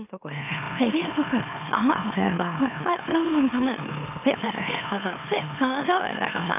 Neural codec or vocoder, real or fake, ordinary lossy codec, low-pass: autoencoder, 44.1 kHz, a latent of 192 numbers a frame, MeloTTS; fake; none; 3.6 kHz